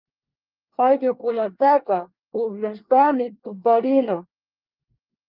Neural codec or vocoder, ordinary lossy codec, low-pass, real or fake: codec, 24 kHz, 1 kbps, SNAC; Opus, 32 kbps; 5.4 kHz; fake